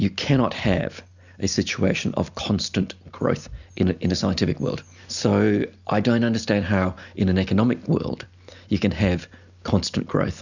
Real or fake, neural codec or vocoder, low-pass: real; none; 7.2 kHz